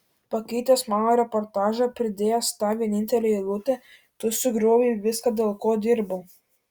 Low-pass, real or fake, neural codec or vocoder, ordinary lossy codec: 19.8 kHz; real; none; Opus, 64 kbps